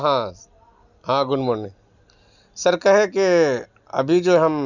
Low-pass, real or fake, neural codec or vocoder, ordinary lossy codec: 7.2 kHz; real; none; none